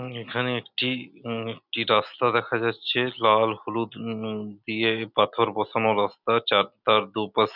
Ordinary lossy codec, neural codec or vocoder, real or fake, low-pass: none; none; real; 5.4 kHz